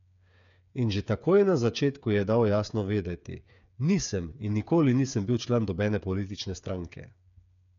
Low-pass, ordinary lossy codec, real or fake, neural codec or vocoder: 7.2 kHz; none; fake; codec, 16 kHz, 8 kbps, FreqCodec, smaller model